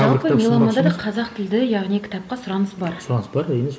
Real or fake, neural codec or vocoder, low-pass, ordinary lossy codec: real; none; none; none